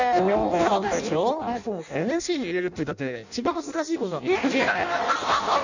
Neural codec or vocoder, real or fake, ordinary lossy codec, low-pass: codec, 16 kHz in and 24 kHz out, 0.6 kbps, FireRedTTS-2 codec; fake; none; 7.2 kHz